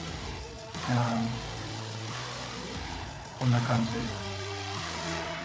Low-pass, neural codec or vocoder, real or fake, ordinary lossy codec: none; codec, 16 kHz, 8 kbps, FreqCodec, larger model; fake; none